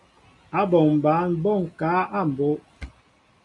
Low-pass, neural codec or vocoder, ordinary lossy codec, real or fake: 10.8 kHz; none; MP3, 96 kbps; real